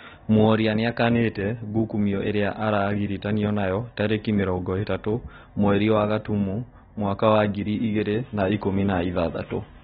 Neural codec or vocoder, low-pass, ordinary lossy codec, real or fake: none; 7.2 kHz; AAC, 16 kbps; real